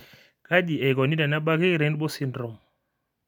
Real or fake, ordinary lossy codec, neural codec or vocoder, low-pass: real; none; none; 19.8 kHz